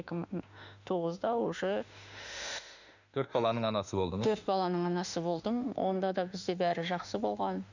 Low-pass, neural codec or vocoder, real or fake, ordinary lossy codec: 7.2 kHz; autoencoder, 48 kHz, 32 numbers a frame, DAC-VAE, trained on Japanese speech; fake; none